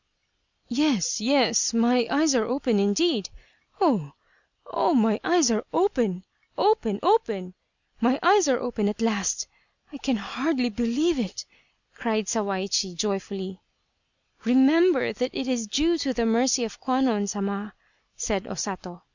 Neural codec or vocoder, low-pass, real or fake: none; 7.2 kHz; real